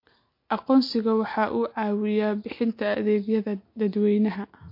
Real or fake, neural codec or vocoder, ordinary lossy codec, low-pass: real; none; MP3, 32 kbps; 5.4 kHz